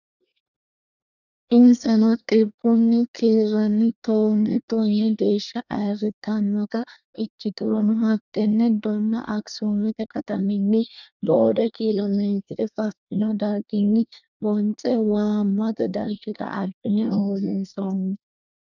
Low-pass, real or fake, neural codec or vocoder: 7.2 kHz; fake; codec, 24 kHz, 1 kbps, SNAC